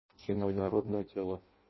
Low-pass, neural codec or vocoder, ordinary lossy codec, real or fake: 7.2 kHz; codec, 16 kHz in and 24 kHz out, 0.6 kbps, FireRedTTS-2 codec; MP3, 24 kbps; fake